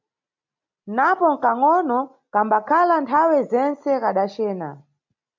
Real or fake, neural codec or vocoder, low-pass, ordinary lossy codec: real; none; 7.2 kHz; MP3, 64 kbps